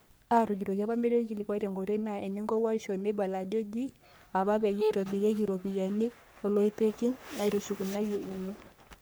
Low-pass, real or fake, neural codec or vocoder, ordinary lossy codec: none; fake; codec, 44.1 kHz, 3.4 kbps, Pupu-Codec; none